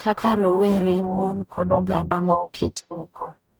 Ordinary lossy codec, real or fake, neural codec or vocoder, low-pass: none; fake; codec, 44.1 kHz, 0.9 kbps, DAC; none